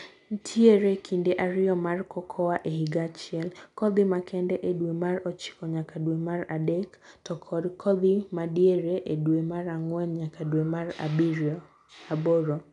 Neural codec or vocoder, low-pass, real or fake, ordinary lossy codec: none; 10.8 kHz; real; none